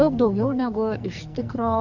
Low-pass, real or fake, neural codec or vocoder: 7.2 kHz; fake; codec, 44.1 kHz, 2.6 kbps, SNAC